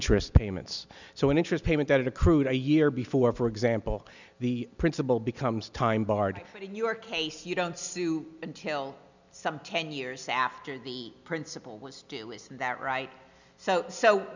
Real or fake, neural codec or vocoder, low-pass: real; none; 7.2 kHz